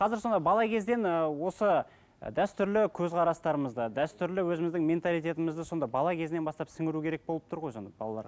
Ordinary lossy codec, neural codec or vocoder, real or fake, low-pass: none; none; real; none